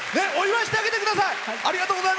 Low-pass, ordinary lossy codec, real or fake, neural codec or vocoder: none; none; real; none